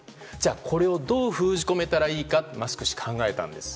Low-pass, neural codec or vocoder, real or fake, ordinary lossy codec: none; none; real; none